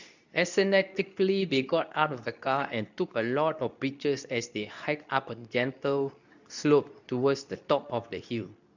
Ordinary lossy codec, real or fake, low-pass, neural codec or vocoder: none; fake; 7.2 kHz; codec, 24 kHz, 0.9 kbps, WavTokenizer, medium speech release version 2